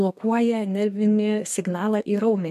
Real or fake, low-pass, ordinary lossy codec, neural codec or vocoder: fake; 14.4 kHz; MP3, 96 kbps; codec, 32 kHz, 1.9 kbps, SNAC